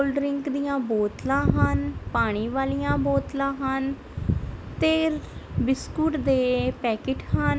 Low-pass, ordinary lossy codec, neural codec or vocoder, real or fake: none; none; none; real